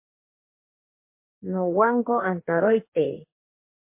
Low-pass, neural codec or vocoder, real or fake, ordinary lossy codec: 3.6 kHz; codec, 44.1 kHz, 2.6 kbps, DAC; fake; MP3, 24 kbps